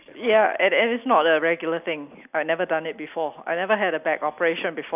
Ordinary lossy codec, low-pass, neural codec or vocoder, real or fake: none; 3.6 kHz; none; real